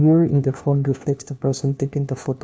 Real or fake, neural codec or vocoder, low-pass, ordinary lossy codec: fake; codec, 16 kHz, 1 kbps, FunCodec, trained on LibriTTS, 50 frames a second; none; none